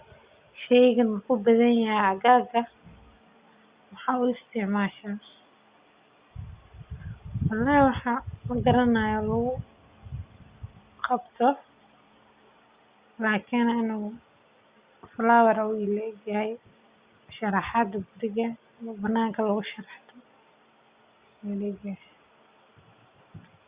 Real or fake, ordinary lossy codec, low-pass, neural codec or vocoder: real; Opus, 64 kbps; 3.6 kHz; none